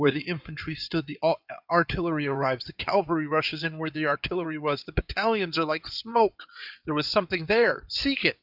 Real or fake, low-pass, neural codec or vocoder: fake; 5.4 kHz; codec, 16 kHz in and 24 kHz out, 2.2 kbps, FireRedTTS-2 codec